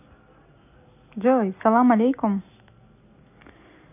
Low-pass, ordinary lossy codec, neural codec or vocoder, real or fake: 3.6 kHz; none; none; real